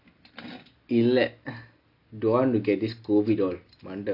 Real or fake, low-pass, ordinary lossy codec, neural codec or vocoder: real; 5.4 kHz; AAC, 48 kbps; none